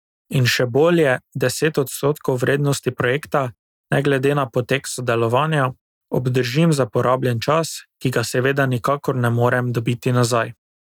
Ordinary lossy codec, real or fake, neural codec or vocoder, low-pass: none; real; none; 19.8 kHz